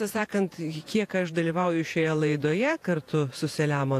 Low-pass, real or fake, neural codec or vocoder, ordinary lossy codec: 14.4 kHz; fake; vocoder, 48 kHz, 128 mel bands, Vocos; AAC, 64 kbps